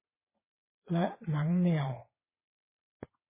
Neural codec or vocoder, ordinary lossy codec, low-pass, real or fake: vocoder, 22.05 kHz, 80 mel bands, WaveNeXt; MP3, 16 kbps; 3.6 kHz; fake